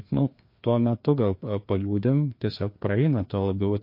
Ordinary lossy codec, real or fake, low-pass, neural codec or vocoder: MP3, 32 kbps; fake; 5.4 kHz; codec, 16 kHz, 2 kbps, FreqCodec, larger model